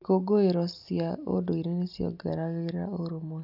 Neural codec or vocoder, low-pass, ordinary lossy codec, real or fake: none; 5.4 kHz; none; real